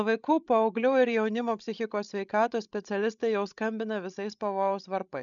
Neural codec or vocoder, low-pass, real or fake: codec, 16 kHz, 16 kbps, FreqCodec, larger model; 7.2 kHz; fake